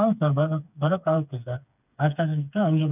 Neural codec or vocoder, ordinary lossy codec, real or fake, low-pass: codec, 16 kHz, 4 kbps, FreqCodec, smaller model; none; fake; 3.6 kHz